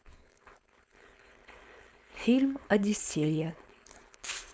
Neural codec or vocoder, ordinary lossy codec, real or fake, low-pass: codec, 16 kHz, 4.8 kbps, FACodec; none; fake; none